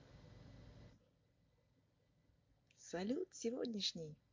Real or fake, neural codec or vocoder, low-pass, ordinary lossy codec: real; none; 7.2 kHz; MP3, 48 kbps